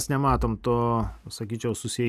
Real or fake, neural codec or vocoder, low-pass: real; none; 14.4 kHz